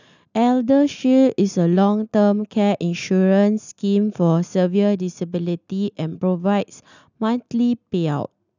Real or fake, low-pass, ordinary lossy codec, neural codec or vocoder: real; 7.2 kHz; none; none